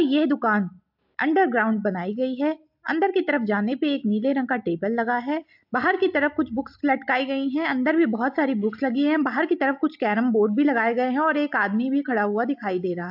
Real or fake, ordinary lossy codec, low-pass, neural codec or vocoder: real; AAC, 48 kbps; 5.4 kHz; none